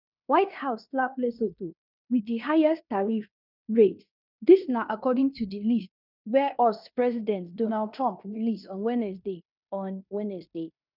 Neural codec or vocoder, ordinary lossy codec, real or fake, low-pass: codec, 16 kHz in and 24 kHz out, 0.9 kbps, LongCat-Audio-Codec, fine tuned four codebook decoder; none; fake; 5.4 kHz